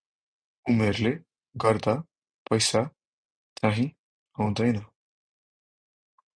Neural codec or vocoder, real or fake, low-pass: none; real; 9.9 kHz